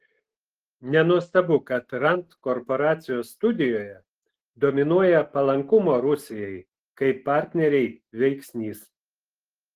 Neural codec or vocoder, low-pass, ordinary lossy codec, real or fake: none; 14.4 kHz; Opus, 16 kbps; real